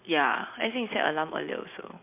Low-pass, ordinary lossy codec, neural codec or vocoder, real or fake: 3.6 kHz; MP3, 32 kbps; none; real